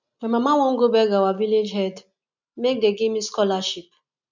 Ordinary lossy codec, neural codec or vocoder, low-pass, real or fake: none; none; 7.2 kHz; real